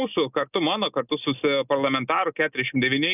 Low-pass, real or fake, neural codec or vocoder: 3.6 kHz; real; none